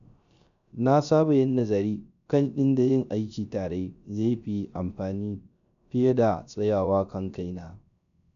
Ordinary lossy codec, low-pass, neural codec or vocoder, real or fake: none; 7.2 kHz; codec, 16 kHz, 0.3 kbps, FocalCodec; fake